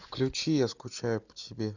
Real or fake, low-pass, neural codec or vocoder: real; 7.2 kHz; none